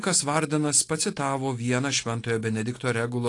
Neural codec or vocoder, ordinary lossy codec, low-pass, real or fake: none; AAC, 48 kbps; 10.8 kHz; real